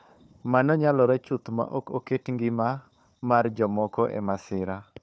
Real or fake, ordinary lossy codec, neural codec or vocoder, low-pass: fake; none; codec, 16 kHz, 4 kbps, FunCodec, trained on Chinese and English, 50 frames a second; none